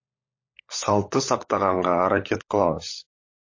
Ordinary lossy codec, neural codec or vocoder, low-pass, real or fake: MP3, 32 kbps; codec, 16 kHz, 16 kbps, FunCodec, trained on LibriTTS, 50 frames a second; 7.2 kHz; fake